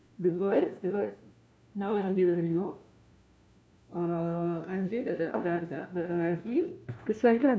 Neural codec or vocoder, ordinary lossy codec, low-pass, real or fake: codec, 16 kHz, 1 kbps, FunCodec, trained on LibriTTS, 50 frames a second; none; none; fake